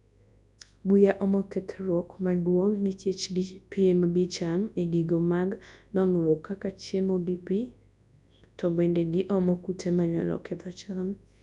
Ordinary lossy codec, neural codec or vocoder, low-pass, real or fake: none; codec, 24 kHz, 0.9 kbps, WavTokenizer, large speech release; 10.8 kHz; fake